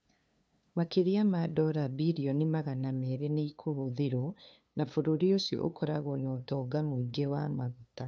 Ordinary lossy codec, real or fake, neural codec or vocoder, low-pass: none; fake; codec, 16 kHz, 2 kbps, FunCodec, trained on LibriTTS, 25 frames a second; none